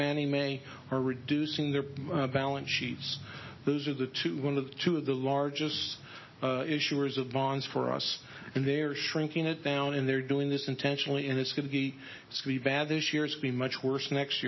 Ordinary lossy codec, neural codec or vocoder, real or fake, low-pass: MP3, 24 kbps; none; real; 7.2 kHz